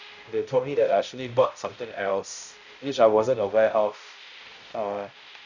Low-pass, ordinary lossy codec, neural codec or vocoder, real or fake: 7.2 kHz; none; codec, 16 kHz, 0.5 kbps, X-Codec, HuBERT features, trained on balanced general audio; fake